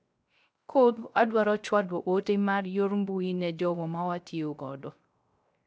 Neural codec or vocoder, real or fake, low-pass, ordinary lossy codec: codec, 16 kHz, 0.3 kbps, FocalCodec; fake; none; none